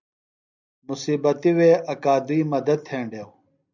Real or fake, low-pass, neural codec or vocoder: real; 7.2 kHz; none